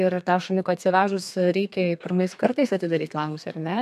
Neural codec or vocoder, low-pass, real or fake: codec, 32 kHz, 1.9 kbps, SNAC; 14.4 kHz; fake